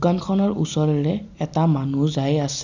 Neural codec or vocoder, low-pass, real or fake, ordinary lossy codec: none; 7.2 kHz; real; none